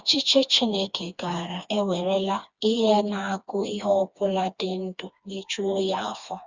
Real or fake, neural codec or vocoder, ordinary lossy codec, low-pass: fake; codec, 16 kHz, 2 kbps, FreqCodec, smaller model; Opus, 64 kbps; 7.2 kHz